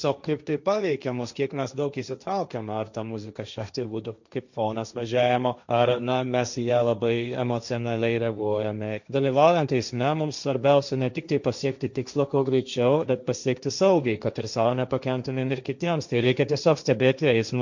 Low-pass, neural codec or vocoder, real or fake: 7.2 kHz; codec, 16 kHz, 1.1 kbps, Voila-Tokenizer; fake